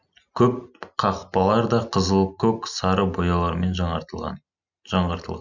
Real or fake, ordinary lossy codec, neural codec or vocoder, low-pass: real; none; none; 7.2 kHz